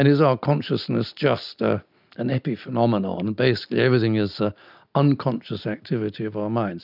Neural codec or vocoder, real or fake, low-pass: none; real; 5.4 kHz